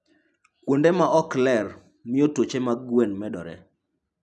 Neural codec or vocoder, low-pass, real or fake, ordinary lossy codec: none; none; real; none